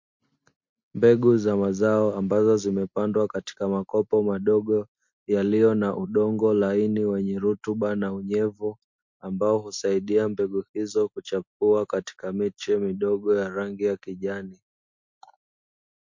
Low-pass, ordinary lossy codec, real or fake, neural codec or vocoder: 7.2 kHz; MP3, 48 kbps; real; none